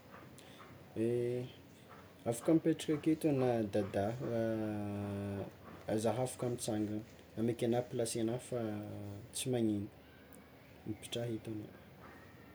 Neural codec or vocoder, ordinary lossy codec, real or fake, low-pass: none; none; real; none